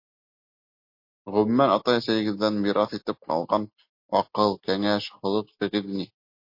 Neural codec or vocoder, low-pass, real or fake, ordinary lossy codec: none; 5.4 kHz; real; MP3, 32 kbps